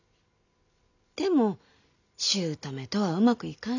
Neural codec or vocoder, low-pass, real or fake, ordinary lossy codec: none; 7.2 kHz; real; none